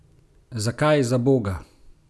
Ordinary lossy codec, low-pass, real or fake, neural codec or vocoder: none; none; real; none